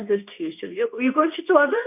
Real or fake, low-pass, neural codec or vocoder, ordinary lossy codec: fake; 3.6 kHz; codec, 24 kHz, 0.9 kbps, WavTokenizer, medium speech release version 2; none